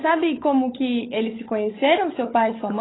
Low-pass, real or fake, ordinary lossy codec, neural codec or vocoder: 7.2 kHz; fake; AAC, 16 kbps; codec, 16 kHz, 16 kbps, FunCodec, trained on LibriTTS, 50 frames a second